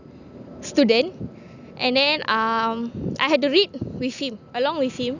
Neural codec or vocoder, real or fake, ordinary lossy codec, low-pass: none; real; none; 7.2 kHz